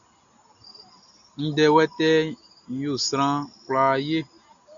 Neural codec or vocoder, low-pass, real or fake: none; 7.2 kHz; real